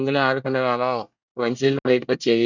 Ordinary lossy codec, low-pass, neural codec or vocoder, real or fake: none; 7.2 kHz; codec, 24 kHz, 1 kbps, SNAC; fake